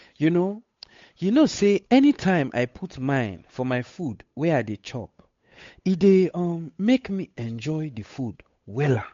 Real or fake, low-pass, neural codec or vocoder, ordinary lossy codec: fake; 7.2 kHz; codec, 16 kHz, 8 kbps, FunCodec, trained on Chinese and English, 25 frames a second; MP3, 48 kbps